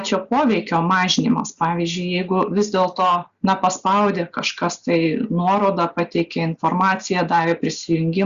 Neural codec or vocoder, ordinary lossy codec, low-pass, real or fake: none; Opus, 64 kbps; 7.2 kHz; real